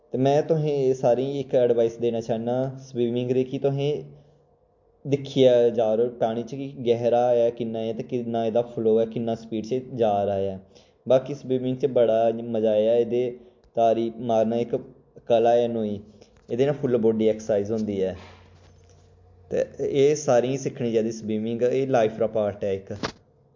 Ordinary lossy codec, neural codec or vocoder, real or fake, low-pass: MP3, 48 kbps; none; real; 7.2 kHz